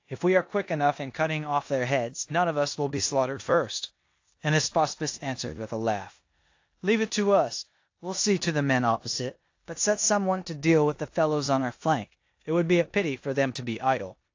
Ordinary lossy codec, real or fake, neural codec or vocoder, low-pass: AAC, 48 kbps; fake; codec, 16 kHz in and 24 kHz out, 0.9 kbps, LongCat-Audio-Codec, four codebook decoder; 7.2 kHz